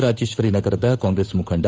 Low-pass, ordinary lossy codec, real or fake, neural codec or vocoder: none; none; fake; codec, 16 kHz, 8 kbps, FunCodec, trained on Chinese and English, 25 frames a second